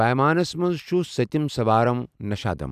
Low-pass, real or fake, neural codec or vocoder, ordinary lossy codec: 14.4 kHz; real; none; none